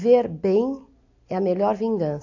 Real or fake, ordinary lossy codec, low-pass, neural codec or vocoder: real; AAC, 48 kbps; 7.2 kHz; none